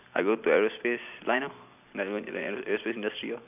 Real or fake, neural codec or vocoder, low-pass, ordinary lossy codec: fake; vocoder, 44.1 kHz, 128 mel bands every 256 samples, BigVGAN v2; 3.6 kHz; none